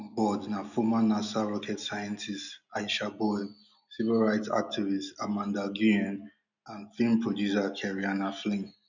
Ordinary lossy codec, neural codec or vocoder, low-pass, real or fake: none; none; 7.2 kHz; real